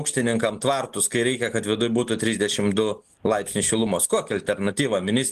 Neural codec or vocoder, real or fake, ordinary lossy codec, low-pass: none; real; Opus, 24 kbps; 14.4 kHz